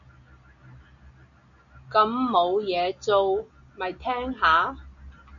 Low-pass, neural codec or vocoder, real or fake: 7.2 kHz; none; real